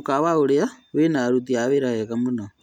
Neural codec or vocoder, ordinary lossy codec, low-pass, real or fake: none; none; 19.8 kHz; real